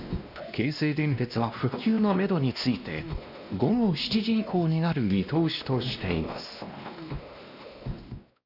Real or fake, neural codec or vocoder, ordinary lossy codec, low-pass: fake; codec, 16 kHz, 1 kbps, X-Codec, WavLM features, trained on Multilingual LibriSpeech; none; 5.4 kHz